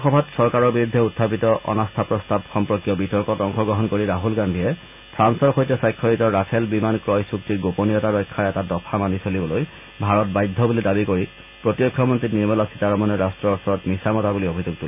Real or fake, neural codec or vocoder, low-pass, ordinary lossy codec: real; none; 3.6 kHz; none